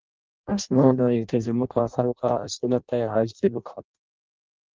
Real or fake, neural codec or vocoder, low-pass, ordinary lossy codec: fake; codec, 16 kHz in and 24 kHz out, 0.6 kbps, FireRedTTS-2 codec; 7.2 kHz; Opus, 32 kbps